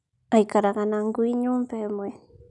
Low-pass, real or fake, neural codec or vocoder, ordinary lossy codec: 10.8 kHz; real; none; none